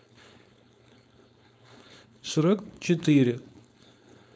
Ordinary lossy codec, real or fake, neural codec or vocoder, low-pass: none; fake; codec, 16 kHz, 4.8 kbps, FACodec; none